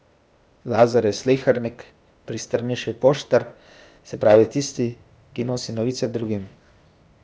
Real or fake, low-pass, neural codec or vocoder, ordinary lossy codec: fake; none; codec, 16 kHz, 0.8 kbps, ZipCodec; none